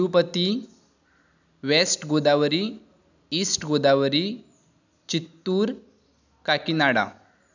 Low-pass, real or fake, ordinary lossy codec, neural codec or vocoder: 7.2 kHz; real; none; none